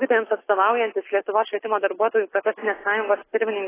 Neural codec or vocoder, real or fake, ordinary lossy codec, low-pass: none; real; AAC, 16 kbps; 3.6 kHz